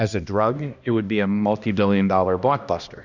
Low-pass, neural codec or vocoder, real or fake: 7.2 kHz; codec, 16 kHz, 1 kbps, X-Codec, HuBERT features, trained on balanced general audio; fake